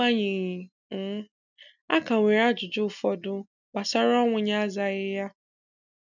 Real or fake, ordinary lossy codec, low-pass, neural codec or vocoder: real; none; 7.2 kHz; none